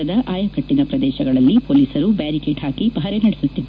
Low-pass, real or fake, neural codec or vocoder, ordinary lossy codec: none; real; none; none